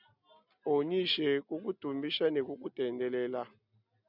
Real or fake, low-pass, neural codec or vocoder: real; 5.4 kHz; none